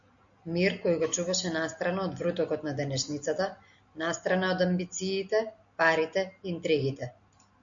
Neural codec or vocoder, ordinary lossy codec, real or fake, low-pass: none; MP3, 64 kbps; real; 7.2 kHz